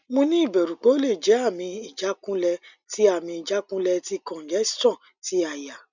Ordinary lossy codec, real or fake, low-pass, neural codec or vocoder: none; real; 7.2 kHz; none